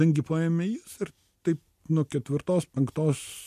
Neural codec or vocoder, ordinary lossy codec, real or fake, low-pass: none; MP3, 64 kbps; real; 14.4 kHz